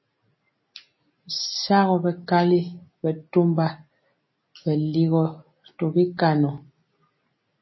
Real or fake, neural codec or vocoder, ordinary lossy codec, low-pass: real; none; MP3, 24 kbps; 7.2 kHz